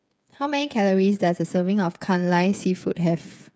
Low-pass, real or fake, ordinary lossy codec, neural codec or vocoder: none; fake; none; codec, 16 kHz, 8 kbps, FreqCodec, smaller model